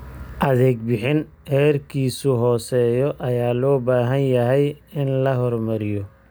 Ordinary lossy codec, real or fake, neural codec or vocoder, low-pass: none; real; none; none